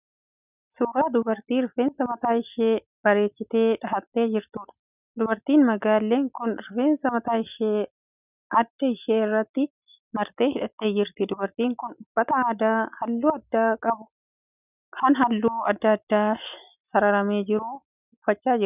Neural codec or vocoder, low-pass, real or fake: none; 3.6 kHz; real